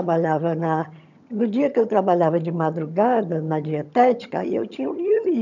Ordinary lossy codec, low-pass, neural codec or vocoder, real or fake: none; 7.2 kHz; vocoder, 22.05 kHz, 80 mel bands, HiFi-GAN; fake